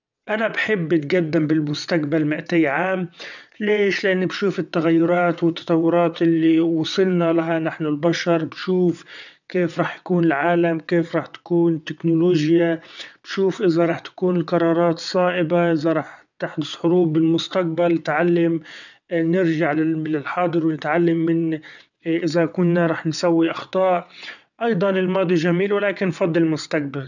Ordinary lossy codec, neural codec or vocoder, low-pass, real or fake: none; vocoder, 22.05 kHz, 80 mel bands, WaveNeXt; 7.2 kHz; fake